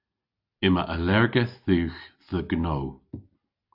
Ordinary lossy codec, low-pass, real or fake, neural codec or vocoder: AAC, 48 kbps; 5.4 kHz; real; none